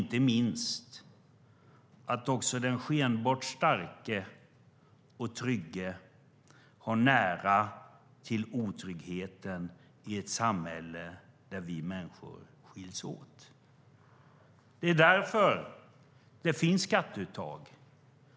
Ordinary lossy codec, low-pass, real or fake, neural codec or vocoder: none; none; real; none